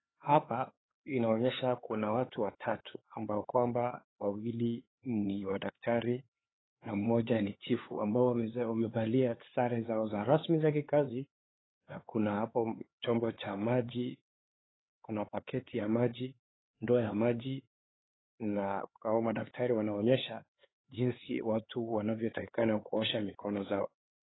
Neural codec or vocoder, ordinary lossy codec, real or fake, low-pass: codec, 16 kHz, 4 kbps, X-Codec, HuBERT features, trained on LibriSpeech; AAC, 16 kbps; fake; 7.2 kHz